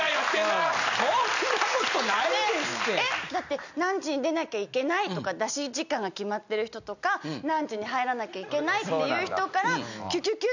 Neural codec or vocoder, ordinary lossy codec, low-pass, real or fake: none; none; 7.2 kHz; real